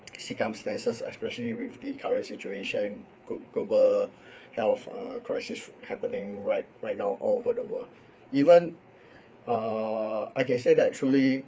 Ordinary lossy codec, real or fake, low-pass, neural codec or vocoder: none; fake; none; codec, 16 kHz, 4 kbps, FreqCodec, larger model